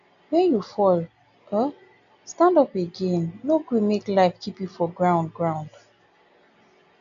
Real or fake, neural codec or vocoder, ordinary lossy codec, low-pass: real; none; none; 7.2 kHz